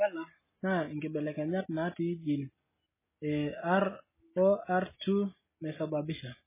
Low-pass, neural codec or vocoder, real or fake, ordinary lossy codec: 3.6 kHz; none; real; MP3, 16 kbps